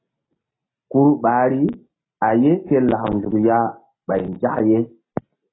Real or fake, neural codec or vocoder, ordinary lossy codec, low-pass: real; none; AAC, 16 kbps; 7.2 kHz